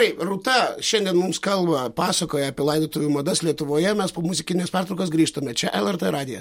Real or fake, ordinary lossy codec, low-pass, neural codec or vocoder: real; MP3, 64 kbps; 19.8 kHz; none